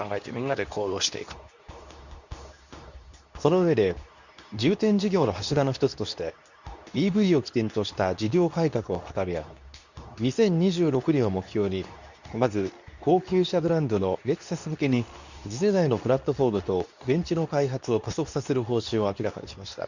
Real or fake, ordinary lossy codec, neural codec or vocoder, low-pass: fake; none; codec, 24 kHz, 0.9 kbps, WavTokenizer, medium speech release version 2; 7.2 kHz